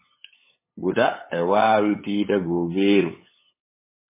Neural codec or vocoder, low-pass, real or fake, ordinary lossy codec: codec, 16 kHz, 8 kbps, FunCodec, trained on LibriTTS, 25 frames a second; 3.6 kHz; fake; MP3, 16 kbps